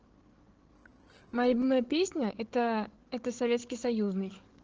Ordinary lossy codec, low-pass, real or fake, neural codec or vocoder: Opus, 16 kbps; 7.2 kHz; fake; codec, 16 kHz, 8 kbps, FreqCodec, larger model